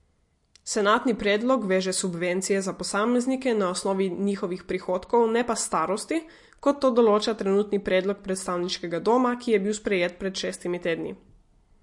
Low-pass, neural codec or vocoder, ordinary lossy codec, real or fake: 10.8 kHz; none; MP3, 48 kbps; real